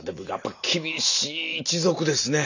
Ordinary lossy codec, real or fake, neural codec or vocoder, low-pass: none; real; none; 7.2 kHz